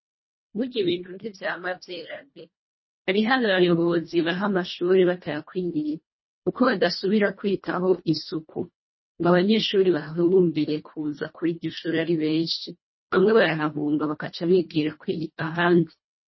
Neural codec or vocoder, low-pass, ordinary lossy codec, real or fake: codec, 24 kHz, 1.5 kbps, HILCodec; 7.2 kHz; MP3, 24 kbps; fake